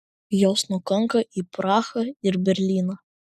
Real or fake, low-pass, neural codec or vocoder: real; 14.4 kHz; none